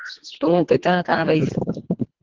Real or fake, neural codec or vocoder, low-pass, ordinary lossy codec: fake; codec, 24 kHz, 1.5 kbps, HILCodec; 7.2 kHz; Opus, 16 kbps